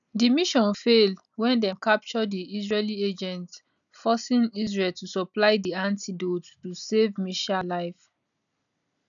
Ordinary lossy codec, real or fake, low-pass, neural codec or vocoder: none; real; 7.2 kHz; none